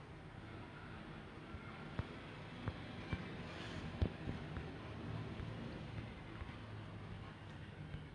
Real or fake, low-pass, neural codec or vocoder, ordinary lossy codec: real; 9.9 kHz; none; none